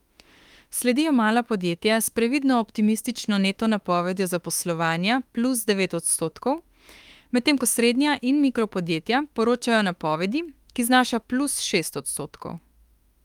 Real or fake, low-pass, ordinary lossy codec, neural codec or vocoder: fake; 19.8 kHz; Opus, 32 kbps; autoencoder, 48 kHz, 32 numbers a frame, DAC-VAE, trained on Japanese speech